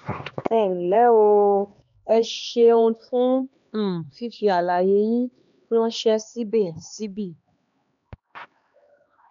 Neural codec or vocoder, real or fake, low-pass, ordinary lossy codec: codec, 16 kHz, 2 kbps, X-Codec, HuBERT features, trained on LibriSpeech; fake; 7.2 kHz; none